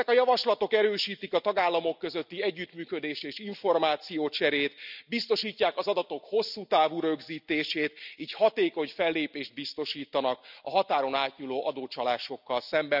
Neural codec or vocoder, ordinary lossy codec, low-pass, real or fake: none; none; 5.4 kHz; real